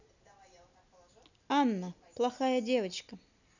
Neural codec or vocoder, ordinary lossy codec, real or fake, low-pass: none; none; real; 7.2 kHz